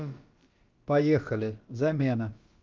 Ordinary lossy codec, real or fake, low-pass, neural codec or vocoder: Opus, 24 kbps; fake; 7.2 kHz; codec, 16 kHz, about 1 kbps, DyCAST, with the encoder's durations